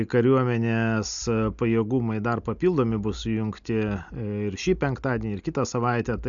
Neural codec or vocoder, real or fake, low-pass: none; real; 7.2 kHz